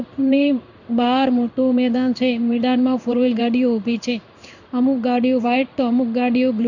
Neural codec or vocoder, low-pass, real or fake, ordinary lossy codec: codec, 16 kHz in and 24 kHz out, 1 kbps, XY-Tokenizer; 7.2 kHz; fake; none